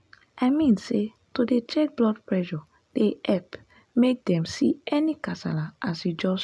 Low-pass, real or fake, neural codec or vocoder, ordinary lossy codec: none; real; none; none